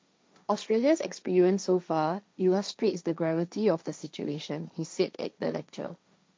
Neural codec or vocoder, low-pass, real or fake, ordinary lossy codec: codec, 16 kHz, 1.1 kbps, Voila-Tokenizer; none; fake; none